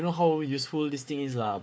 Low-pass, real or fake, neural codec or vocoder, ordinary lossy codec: none; fake; codec, 16 kHz, 4 kbps, FunCodec, trained on Chinese and English, 50 frames a second; none